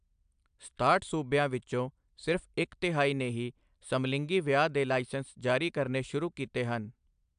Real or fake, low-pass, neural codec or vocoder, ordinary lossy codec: real; 9.9 kHz; none; AAC, 96 kbps